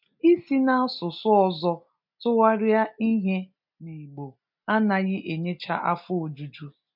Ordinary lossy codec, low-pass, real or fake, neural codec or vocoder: none; 5.4 kHz; real; none